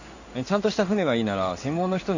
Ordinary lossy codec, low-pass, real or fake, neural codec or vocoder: none; 7.2 kHz; fake; codec, 16 kHz in and 24 kHz out, 1 kbps, XY-Tokenizer